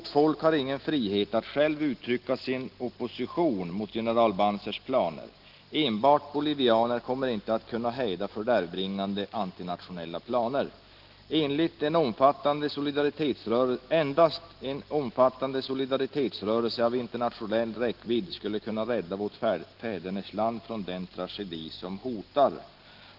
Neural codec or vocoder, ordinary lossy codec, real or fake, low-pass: none; Opus, 16 kbps; real; 5.4 kHz